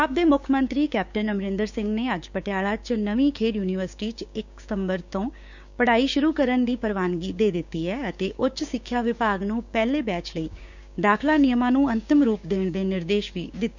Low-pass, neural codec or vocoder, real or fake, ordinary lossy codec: 7.2 kHz; codec, 16 kHz, 6 kbps, DAC; fake; none